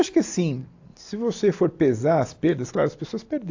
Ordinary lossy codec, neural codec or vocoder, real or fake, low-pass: AAC, 48 kbps; none; real; 7.2 kHz